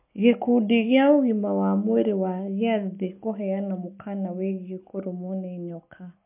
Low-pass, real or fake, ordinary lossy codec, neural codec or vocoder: 3.6 kHz; real; MP3, 32 kbps; none